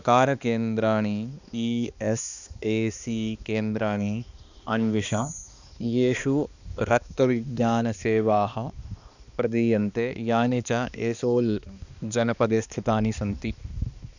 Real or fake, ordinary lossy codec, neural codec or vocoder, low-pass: fake; none; codec, 16 kHz, 2 kbps, X-Codec, HuBERT features, trained on balanced general audio; 7.2 kHz